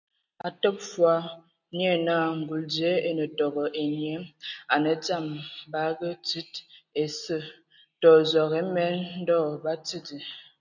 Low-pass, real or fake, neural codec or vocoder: 7.2 kHz; real; none